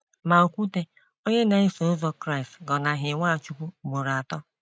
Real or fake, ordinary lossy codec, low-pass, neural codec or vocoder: real; none; none; none